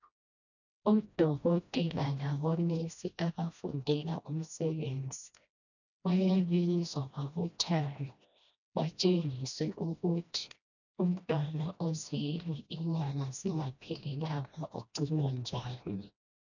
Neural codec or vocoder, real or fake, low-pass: codec, 16 kHz, 1 kbps, FreqCodec, smaller model; fake; 7.2 kHz